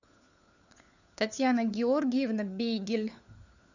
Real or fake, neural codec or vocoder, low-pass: fake; codec, 16 kHz, 4 kbps, FunCodec, trained on LibriTTS, 50 frames a second; 7.2 kHz